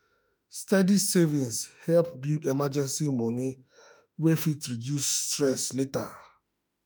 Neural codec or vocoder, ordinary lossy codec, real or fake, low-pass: autoencoder, 48 kHz, 32 numbers a frame, DAC-VAE, trained on Japanese speech; none; fake; none